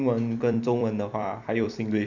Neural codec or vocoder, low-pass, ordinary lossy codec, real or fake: vocoder, 44.1 kHz, 128 mel bands every 256 samples, BigVGAN v2; 7.2 kHz; none; fake